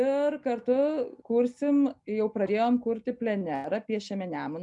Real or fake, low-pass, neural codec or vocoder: real; 10.8 kHz; none